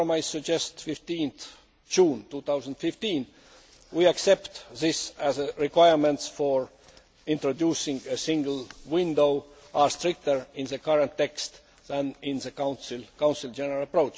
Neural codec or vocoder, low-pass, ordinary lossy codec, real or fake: none; none; none; real